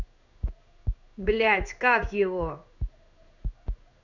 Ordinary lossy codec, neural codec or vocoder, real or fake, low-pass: none; codec, 16 kHz in and 24 kHz out, 1 kbps, XY-Tokenizer; fake; 7.2 kHz